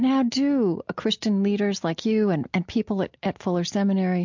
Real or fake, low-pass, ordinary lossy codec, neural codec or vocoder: real; 7.2 kHz; MP3, 64 kbps; none